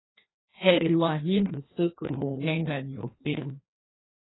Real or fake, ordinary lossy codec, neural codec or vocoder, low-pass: fake; AAC, 16 kbps; codec, 16 kHz, 1 kbps, FreqCodec, larger model; 7.2 kHz